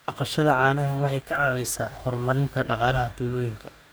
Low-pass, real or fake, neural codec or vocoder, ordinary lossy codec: none; fake; codec, 44.1 kHz, 2.6 kbps, DAC; none